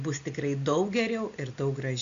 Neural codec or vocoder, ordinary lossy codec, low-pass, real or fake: none; MP3, 64 kbps; 7.2 kHz; real